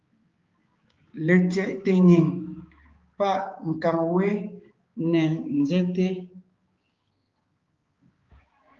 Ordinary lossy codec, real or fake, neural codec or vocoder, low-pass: Opus, 32 kbps; fake; codec, 16 kHz, 4 kbps, X-Codec, HuBERT features, trained on balanced general audio; 7.2 kHz